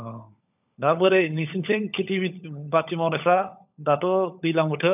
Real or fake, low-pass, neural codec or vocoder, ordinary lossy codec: fake; 3.6 kHz; codec, 16 kHz, 16 kbps, FunCodec, trained on LibriTTS, 50 frames a second; none